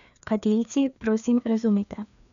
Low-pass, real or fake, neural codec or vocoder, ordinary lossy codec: 7.2 kHz; fake; codec, 16 kHz, 2 kbps, FreqCodec, larger model; MP3, 96 kbps